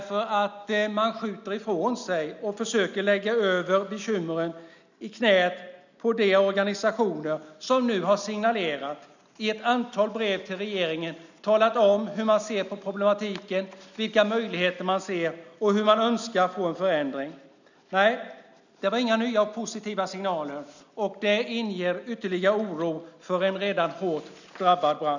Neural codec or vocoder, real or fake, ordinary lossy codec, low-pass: none; real; none; 7.2 kHz